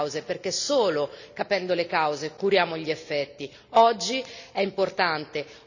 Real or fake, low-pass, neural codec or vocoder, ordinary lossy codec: real; 7.2 kHz; none; none